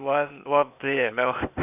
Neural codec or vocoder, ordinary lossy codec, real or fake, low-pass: codec, 16 kHz, 0.8 kbps, ZipCodec; none; fake; 3.6 kHz